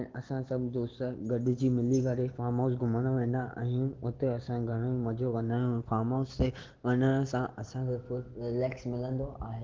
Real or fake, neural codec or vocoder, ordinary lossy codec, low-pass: real; none; Opus, 16 kbps; 7.2 kHz